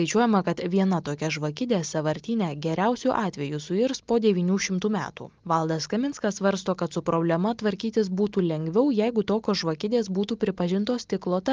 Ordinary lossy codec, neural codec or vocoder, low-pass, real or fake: Opus, 24 kbps; none; 7.2 kHz; real